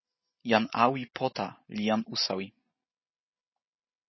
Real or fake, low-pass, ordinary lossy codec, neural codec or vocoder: real; 7.2 kHz; MP3, 24 kbps; none